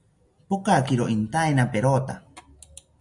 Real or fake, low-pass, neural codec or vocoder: real; 10.8 kHz; none